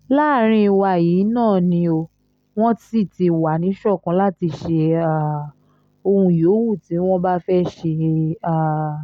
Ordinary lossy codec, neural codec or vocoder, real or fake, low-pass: none; none; real; 19.8 kHz